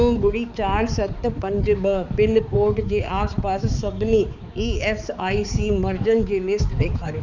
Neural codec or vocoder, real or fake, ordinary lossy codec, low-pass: codec, 16 kHz, 4 kbps, X-Codec, HuBERT features, trained on balanced general audio; fake; none; 7.2 kHz